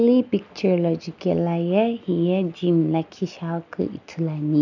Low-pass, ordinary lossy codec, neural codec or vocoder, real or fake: 7.2 kHz; none; none; real